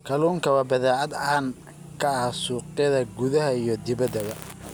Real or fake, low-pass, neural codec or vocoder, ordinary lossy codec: real; none; none; none